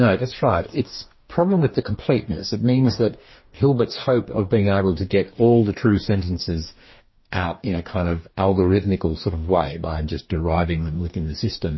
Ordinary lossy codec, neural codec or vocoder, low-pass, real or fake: MP3, 24 kbps; codec, 44.1 kHz, 2.6 kbps, DAC; 7.2 kHz; fake